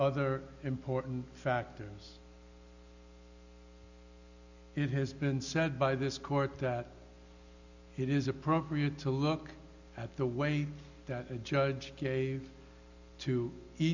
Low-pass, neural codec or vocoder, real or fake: 7.2 kHz; none; real